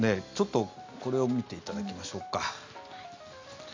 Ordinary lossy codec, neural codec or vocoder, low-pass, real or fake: MP3, 64 kbps; none; 7.2 kHz; real